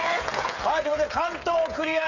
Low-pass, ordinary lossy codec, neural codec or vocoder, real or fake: 7.2 kHz; Opus, 64 kbps; codec, 16 kHz, 8 kbps, FreqCodec, smaller model; fake